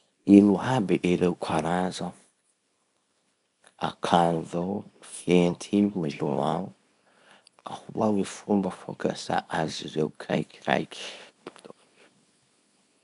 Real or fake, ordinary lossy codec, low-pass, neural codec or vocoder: fake; none; 10.8 kHz; codec, 24 kHz, 0.9 kbps, WavTokenizer, small release